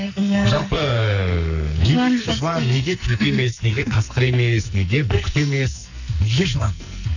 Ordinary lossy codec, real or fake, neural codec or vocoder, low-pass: none; fake; codec, 44.1 kHz, 2.6 kbps, SNAC; 7.2 kHz